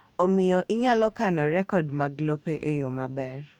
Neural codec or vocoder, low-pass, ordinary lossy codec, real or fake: codec, 44.1 kHz, 2.6 kbps, DAC; 19.8 kHz; none; fake